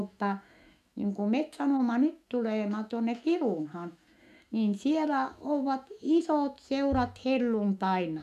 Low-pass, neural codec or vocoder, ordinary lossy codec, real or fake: 14.4 kHz; autoencoder, 48 kHz, 128 numbers a frame, DAC-VAE, trained on Japanese speech; none; fake